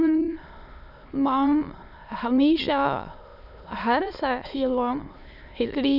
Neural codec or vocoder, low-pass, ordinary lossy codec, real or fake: autoencoder, 22.05 kHz, a latent of 192 numbers a frame, VITS, trained on many speakers; 5.4 kHz; none; fake